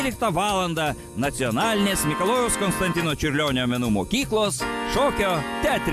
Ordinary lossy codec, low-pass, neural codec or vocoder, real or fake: AAC, 96 kbps; 14.4 kHz; none; real